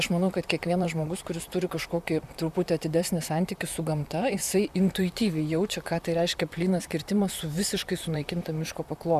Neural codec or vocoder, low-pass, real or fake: vocoder, 44.1 kHz, 128 mel bands, Pupu-Vocoder; 14.4 kHz; fake